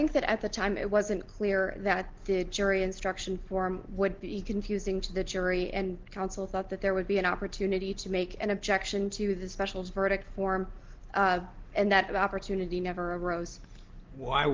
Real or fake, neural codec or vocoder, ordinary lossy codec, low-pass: real; none; Opus, 16 kbps; 7.2 kHz